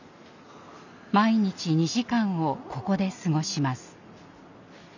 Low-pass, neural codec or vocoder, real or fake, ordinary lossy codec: 7.2 kHz; none; real; none